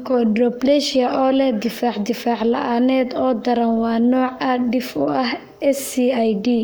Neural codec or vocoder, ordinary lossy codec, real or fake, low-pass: codec, 44.1 kHz, 7.8 kbps, Pupu-Codec; none; fake; none